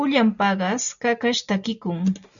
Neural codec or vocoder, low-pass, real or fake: none; 7.2 kHz; real